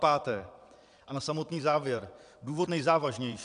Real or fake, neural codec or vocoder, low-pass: fake; vocoder, 22.05 kHz, 80 mel bands, WaveNeXt; 9.9 kHz